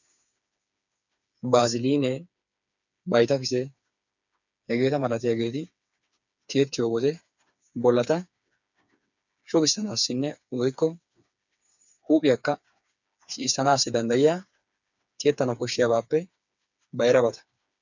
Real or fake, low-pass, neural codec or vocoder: fake; 7.2 kHz; codec, 16 kHz, 4 kbps, FreqCodec, smaller model